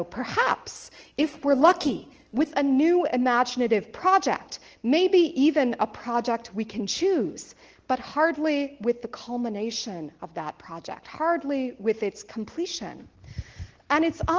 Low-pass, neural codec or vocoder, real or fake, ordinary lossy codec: 7.2 kHz; none; real; Opus, 16 kbps